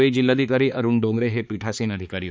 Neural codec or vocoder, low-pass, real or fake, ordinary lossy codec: codec, 16 kHz, 4 kbps, X-Codec, HuBERT features, trained on balanced general audio; none; fake; none